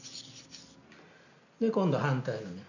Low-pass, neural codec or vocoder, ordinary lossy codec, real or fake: 7.2 kHz; none; none; real